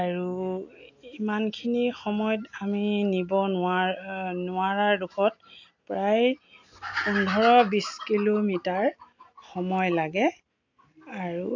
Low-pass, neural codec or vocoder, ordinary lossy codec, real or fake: 7.2 kHz; none; AAC, 48 kbps; real